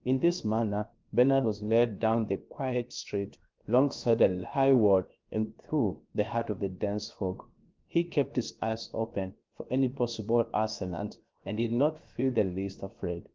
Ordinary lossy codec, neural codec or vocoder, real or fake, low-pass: Opus, 32 kbps; codec, 16 kHz, 0.7 kbps, FocalCodec; fake; 7.2 kHz